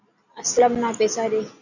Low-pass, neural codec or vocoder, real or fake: 7.2 kHz; none; real